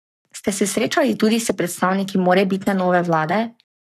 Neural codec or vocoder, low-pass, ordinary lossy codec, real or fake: codec, 44.1 kHz, 7.8 kbps, Pupu-Codec; 14.4 kHz; none; fake